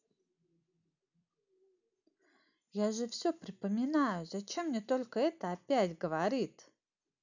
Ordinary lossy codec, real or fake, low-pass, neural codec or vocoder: none; real; 7.2 kHz; none